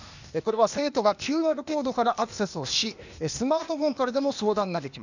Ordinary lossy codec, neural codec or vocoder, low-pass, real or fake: none; codec, 16 kHz, 0.8 kbps, ZipCodec; 7.2 kHz; fake